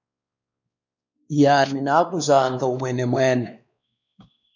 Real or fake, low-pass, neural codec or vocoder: fake; 7.2 kHz; codec, 16 kHz, 2 kbps, X-Codec, WavLM features, trained on Multilingual LibriSpeech